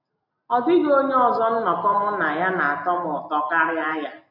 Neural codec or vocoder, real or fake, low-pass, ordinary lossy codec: none; real; 5.4 kHz; none